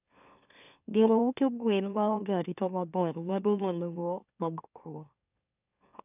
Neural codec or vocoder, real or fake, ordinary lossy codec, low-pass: autoencoder, 44.1 kHz, a latent of 192 numbers a frame, MeloTTS; fake; none; 3.6 kHz